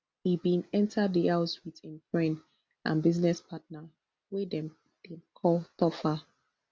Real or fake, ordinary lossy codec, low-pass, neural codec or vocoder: real; none; none; none